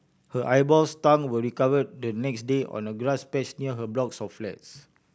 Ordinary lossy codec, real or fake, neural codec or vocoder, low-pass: none; real; none; none